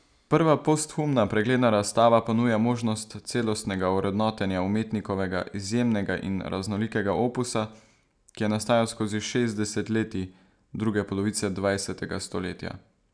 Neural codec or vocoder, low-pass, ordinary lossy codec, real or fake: none; 9.9 kHz; none; real